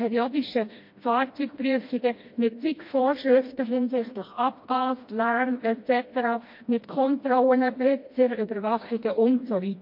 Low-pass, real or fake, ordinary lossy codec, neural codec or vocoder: 5.4 kHz; fake; MP3, 32 kbps; codec, 16 kHz, 1 kbps, FreqCodec, smaller model